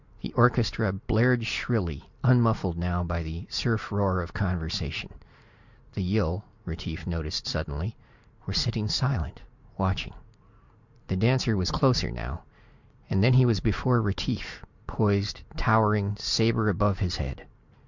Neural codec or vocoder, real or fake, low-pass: none; real; 7.2 kHz